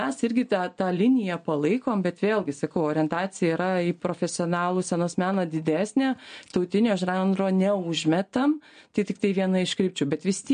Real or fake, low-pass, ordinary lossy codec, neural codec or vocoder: real; 9.9 kHz; MP3, 48 kbps; none